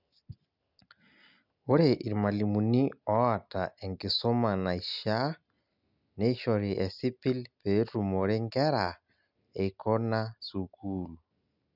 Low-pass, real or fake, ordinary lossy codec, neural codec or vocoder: 5.4 kHz; real; none; none